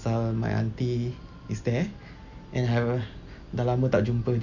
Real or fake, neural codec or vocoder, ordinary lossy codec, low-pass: real; none; none; 7.2 kHz